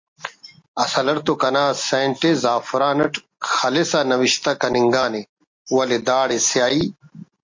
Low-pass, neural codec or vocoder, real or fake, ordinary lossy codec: 7.2 kHz; none; real; MP3, 48 kbps